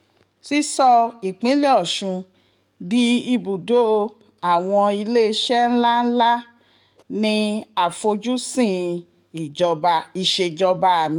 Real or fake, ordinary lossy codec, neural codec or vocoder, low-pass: fake; none; codec, 44.1 kHz, 7.8 kbps, DAC; 19.8 kHz